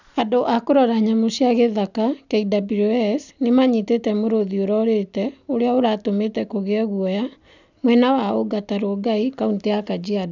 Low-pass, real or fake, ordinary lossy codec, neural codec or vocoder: 7.2 kHz; real; none; none